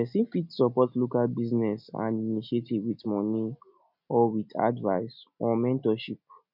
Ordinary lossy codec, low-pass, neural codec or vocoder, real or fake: none; 5.4 kHz; none; real